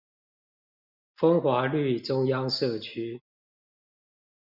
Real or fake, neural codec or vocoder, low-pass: real; none; 5.4 kHz